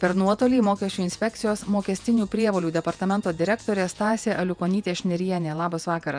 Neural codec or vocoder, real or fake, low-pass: vocoder, 48 kHz, 128 mel bands, Vocos; fake; 9.9 kHz